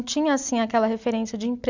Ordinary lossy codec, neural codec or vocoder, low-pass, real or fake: Opus, 64 kbps; none; 7.2 kHz; real